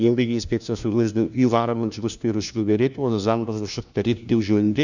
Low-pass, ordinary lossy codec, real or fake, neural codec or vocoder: 7.2 kHz; none; fake; codec, 16 kHz, 1 kbps, FunCodec, trained on LibriTTS, 50 frames a second